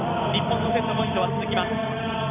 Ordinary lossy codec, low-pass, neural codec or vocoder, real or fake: none; 3.6 kHz; none; real